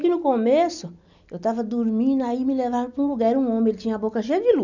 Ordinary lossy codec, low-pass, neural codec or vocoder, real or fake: none; 7.2 kHz; none; real